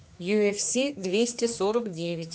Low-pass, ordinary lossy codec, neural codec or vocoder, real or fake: none; none; codec, 16 kHz, 2 kbps, X-Codec, HuBERT features, trained on general audio; fake